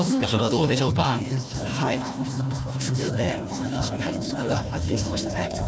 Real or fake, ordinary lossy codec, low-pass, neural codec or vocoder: fake; none; none; codec, 16 kHz, 1 kbps, FunCodec, trained on Chinese and English, 50 frames a second